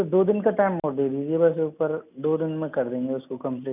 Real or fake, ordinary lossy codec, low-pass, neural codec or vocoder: real; none; 3.6 kHz; none